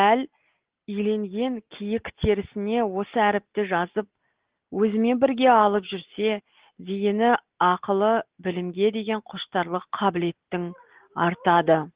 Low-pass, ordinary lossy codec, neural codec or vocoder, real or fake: 3.6 kHz; Opus, 16 kbps; none; real